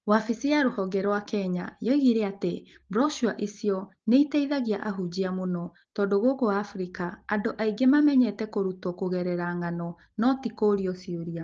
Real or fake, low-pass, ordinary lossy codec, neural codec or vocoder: real; 7.2 kHz; Opus, 16 kbps; none